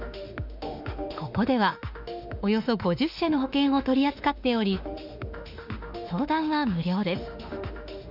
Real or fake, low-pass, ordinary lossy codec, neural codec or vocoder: fake; 5.4 kHz; none; autoencoder, 48 kHz, 32 numbers a frame, DAC-VAE, trained on Japanese speech